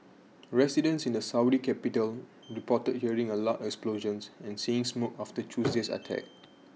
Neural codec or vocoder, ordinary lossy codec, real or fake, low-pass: none; none; real; none